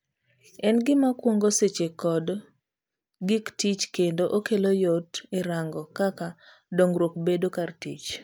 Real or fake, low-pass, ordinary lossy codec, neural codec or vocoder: real; none; none; none